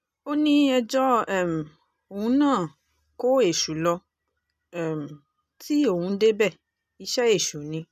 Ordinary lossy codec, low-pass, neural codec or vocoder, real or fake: none; 14.4 kHz; none; real